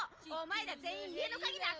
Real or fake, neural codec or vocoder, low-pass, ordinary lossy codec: real; none; 7.2 kHz; Opus, 24 kbps